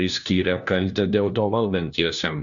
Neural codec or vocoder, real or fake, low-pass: codec, 16 kHz, 1 kbps, FunCodec, trained on LibriTTS, 50 frames a second; fake; 7.2 kHz